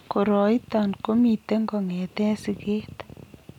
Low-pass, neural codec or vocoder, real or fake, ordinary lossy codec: 19.8 kHz; none; real; none